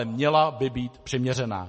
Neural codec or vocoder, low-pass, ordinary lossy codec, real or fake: none; 9.9 kHz; MP3, 32 kbps; real